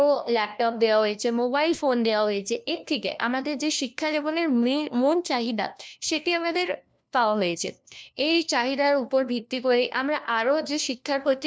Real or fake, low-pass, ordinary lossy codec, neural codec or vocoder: fake; none; none; codec, 16 kHz, 1 kbps, FunCodec, trained on LibriTTS, 50 frames a second